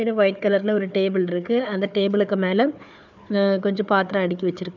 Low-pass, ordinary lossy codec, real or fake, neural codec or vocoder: 7.2 kHz; none; fake; codec, 16 kHz, 4 kbps, FunCodec, trained on Chinese and English, 50 frames a second